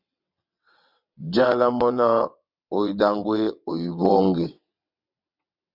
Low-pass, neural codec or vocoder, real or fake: 5.4 kHz; vocoder, 22.05 kHz, 80 mel bands, WaveNeXt; fake